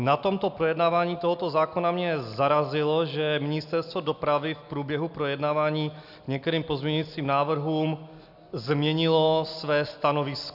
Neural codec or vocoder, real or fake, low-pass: none; real; 5.4 kHz